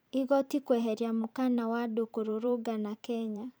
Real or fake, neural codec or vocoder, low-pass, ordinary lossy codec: fake; vocoder, 44.1 kHz, 128 mel bands every 512 samples, BigVGAN v2; none; none